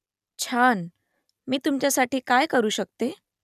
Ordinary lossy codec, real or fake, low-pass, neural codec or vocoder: none; real; 14.4 kHz; none